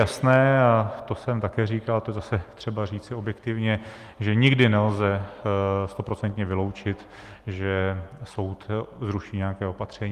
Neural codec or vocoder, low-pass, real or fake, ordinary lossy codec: none; 14.4 kHz; real; Opus, 24 kbps